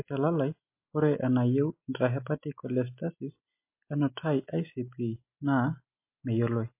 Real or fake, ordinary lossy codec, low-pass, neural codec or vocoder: real; MP3, 24 kbps; 3.6 kHz; none